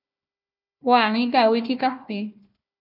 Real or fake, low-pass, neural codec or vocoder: fake; 5.4 kHz; codec, 16 kHz, 1 kbps, FunCodec, trained on Chinese and English, 50 frames a second